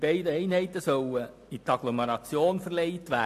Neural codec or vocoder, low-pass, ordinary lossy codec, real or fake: none; 14.4 kHz; AAC, 64 kbps; real